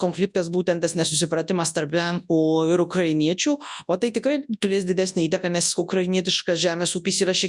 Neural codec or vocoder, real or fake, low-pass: codec, 24 kHz, 0.9 kbps, WavTokenizer, large speech release; fake; 10.8 kHz